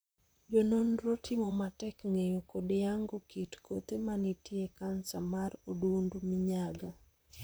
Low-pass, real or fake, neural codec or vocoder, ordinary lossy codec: none; fake; vocoder, 44.1 kHz, 128 mel bands, Pupu-Vocoder; none